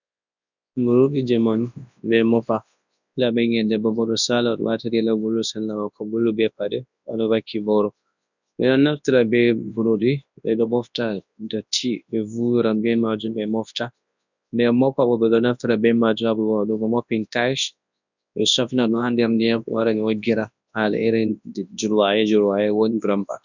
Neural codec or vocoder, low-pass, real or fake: codec, 24 kHz, 0.9 kbps, WavTokenizer, large speech release; 7.2 kHz; fake